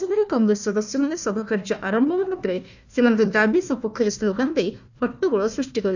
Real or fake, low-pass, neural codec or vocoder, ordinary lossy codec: fake; 7.2 kHz; codec, 16 kHz, 1 kbps, FunCodec, trained on Chinese and English, 50 frames a second; none